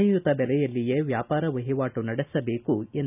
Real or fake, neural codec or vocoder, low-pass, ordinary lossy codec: real; none; 3.6 kHz; none